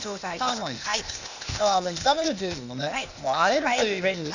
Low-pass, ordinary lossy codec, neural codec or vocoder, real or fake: 7.2 kHz; none; codec, 16 kHz, 0.8 kbps, ZipCodec; fake